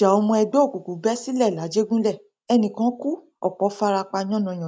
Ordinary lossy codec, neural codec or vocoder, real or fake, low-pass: none; none; real; none